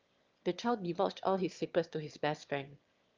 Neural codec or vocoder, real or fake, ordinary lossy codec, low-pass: autoencoder, 22.05 kHz, a latent of 192 numbers a frame, VITS, trained on one speaker; fake; Opus, 32 kbps; 7.2 kHz